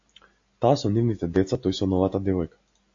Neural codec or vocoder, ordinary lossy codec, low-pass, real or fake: none; AAC, 48 kbps; 7.2 kHz; real